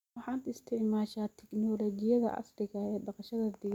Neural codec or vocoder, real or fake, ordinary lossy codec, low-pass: none; real; none; 19.8 kHz